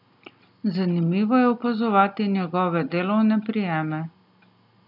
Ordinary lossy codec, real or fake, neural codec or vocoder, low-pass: none; real; none; 5.4 kHz